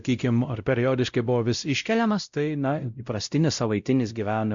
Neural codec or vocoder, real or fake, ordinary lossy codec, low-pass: codec, 16 kHz, 0.5 kbps, X-Codec, WavLM features, trained on Multilingual LibriSpeech; fake; Opus, 64 kbps; 7.2 kHz